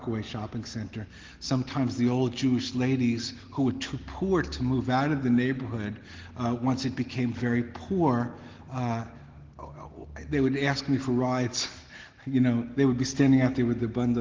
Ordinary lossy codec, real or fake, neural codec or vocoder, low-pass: Opus, 16 kbps; real; none; 7.2 kHz